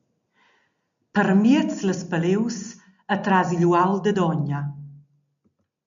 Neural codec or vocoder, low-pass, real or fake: none; 7.2 kHz; real